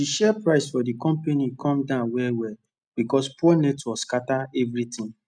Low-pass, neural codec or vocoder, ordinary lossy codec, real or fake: 9.9 kHz; none; none; real